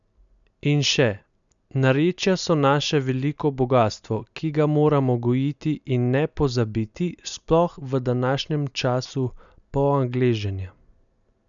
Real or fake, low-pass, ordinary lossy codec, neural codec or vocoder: real; 7.2 kHz; none; none